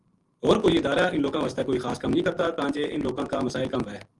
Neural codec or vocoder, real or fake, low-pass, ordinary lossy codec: none; real; 10.8 kHz; Opus, 24 kbps